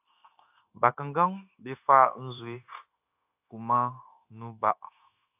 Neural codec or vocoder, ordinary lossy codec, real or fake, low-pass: codec, 16 kHz, 0.9 kbps, LongCat-Audio-Codec; AAC, 32 kbps; fake; 3.6 kHz